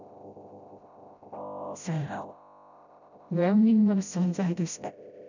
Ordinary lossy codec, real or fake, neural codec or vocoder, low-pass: none; fake; codec, 16 kHz, 0.5 kbps, FreqCodec, smaller model; 7.2 kHz